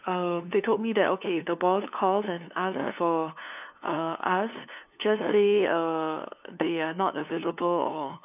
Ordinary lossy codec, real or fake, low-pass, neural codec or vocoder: none; fake; 3.6 kHz; codec, 16 kHz, 2 kbps, FunCodec, trained on LibriTTS, 25 frames a second